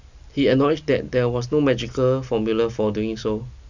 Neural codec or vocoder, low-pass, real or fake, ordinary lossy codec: none; 7.2 kHz; real; none